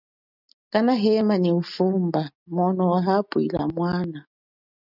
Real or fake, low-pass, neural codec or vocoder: real; 5.4 kHz; none